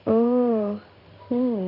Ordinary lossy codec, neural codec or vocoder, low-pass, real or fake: none; codec, 16 kHz in and 24 kHz out, 1 kbps, XY-Tokenizer; 5.4 kHz; fake